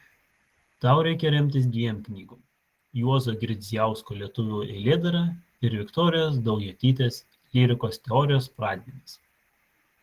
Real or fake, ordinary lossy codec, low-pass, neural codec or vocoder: real; Opus, 16 kbps; 14.4 kHz; none